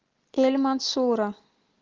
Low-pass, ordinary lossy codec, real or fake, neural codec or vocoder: 7.2 kHz; Opus, 16 kbps; real; none